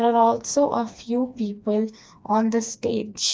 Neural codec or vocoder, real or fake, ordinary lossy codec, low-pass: codec, 16 kHz, 2 kbps, FreqCodec, smaller model; fake; none; none